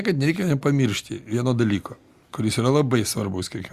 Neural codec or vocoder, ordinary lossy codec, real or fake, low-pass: none; Opus, 64 kbps; real; 14.4 kHz